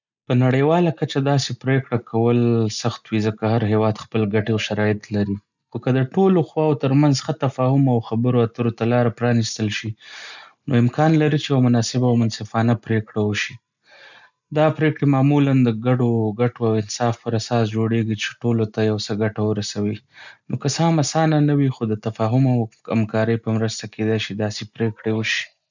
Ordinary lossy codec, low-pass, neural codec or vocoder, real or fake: none; 7.2 kHz; none; real